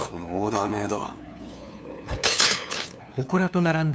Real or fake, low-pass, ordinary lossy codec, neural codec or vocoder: fake; none; none; codec, 16 kHz, 2 kbps, FunCodec, trained on LibriTTS, 25 frames a second